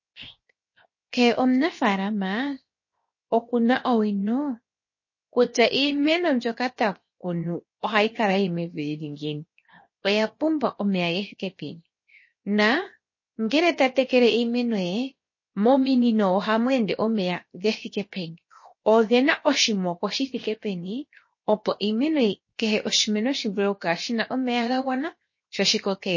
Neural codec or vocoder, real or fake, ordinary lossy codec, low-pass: codec, 16 kHz, 0.7 kbps, FocalCodec; fake; MP3, 32 kbps; 7.2 kHz